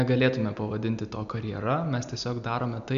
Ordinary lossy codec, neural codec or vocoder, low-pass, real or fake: AAC, 96 kbps; none; 7.2 kHz; real